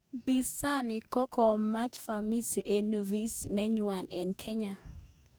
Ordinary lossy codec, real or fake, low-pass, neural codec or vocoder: none; fake; none; codec, 44.1 kHz, 2.6 kbps, DAC